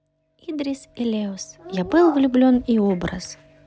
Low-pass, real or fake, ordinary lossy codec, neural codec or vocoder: none; real; none; none